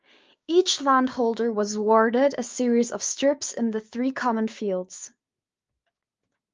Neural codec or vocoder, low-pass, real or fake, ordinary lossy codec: codec, 16 kHz, 6 kbps, DAC; 7.2 kHz; fake; Opus, 32 kbps